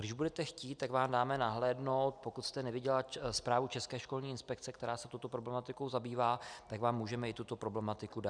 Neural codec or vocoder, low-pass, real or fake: none; 9.9 kHz; real